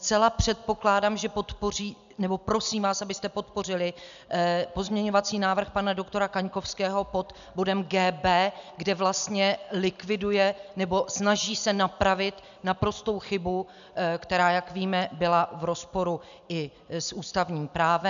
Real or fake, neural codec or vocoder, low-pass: real; none; 7.2 kHz